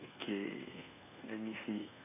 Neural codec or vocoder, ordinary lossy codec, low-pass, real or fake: codec, 24 kHz, 1.2 kbps, DualCodec; none; 3.6 kHz; fake